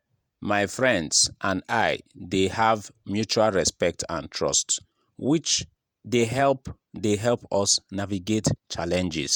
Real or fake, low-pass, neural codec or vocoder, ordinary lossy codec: fake; none; vocoder, 48 kHz, 128 mel bands, Vocos; none